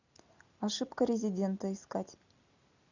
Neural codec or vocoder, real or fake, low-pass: none; real; 7.2 kHz